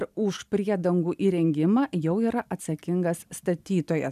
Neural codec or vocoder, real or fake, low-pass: none; real; 14.4 kHz